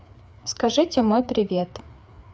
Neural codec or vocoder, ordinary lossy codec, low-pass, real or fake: codec, 16 kHz, 4 kbps, FreqCodec, larger model; none; none; fake